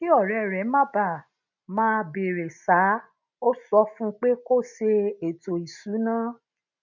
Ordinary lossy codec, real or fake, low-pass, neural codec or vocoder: none; real; 7.2 kHz; none